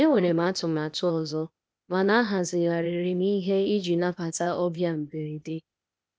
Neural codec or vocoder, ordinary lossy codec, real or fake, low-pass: codec, 16 kHz, 0.8 kbps, ZipCodec; none; fake; none